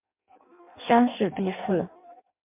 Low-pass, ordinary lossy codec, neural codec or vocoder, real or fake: 3.6 kHz; MP3, 32 kbps; codec, 16 kHz in and 24 kHz out, 0.6 kbps, FireRedTTS-2 codec; fake